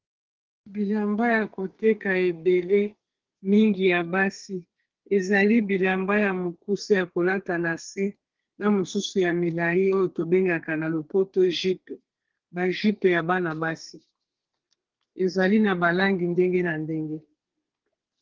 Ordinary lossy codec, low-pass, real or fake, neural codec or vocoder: Opus, 16 kbps; 7.2 kHz; fake; codec, 32 kHz, 1.9 kbps, SNAC